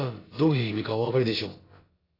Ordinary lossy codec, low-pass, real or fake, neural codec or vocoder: AAC, 24 kbps; 5.4 kHz; fake; codec, 16 kHz, about 1 kbps, DyCAST, with the encoder's durations